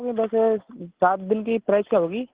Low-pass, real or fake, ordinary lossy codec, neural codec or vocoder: 3.6 kHz; real; Opus, 16 kbps; none